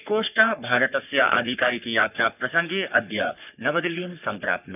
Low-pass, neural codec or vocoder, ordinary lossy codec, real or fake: 3.6 kHz; codec, 44.1 kHz, 3.4 kbps, Pupu-Codec; none; fake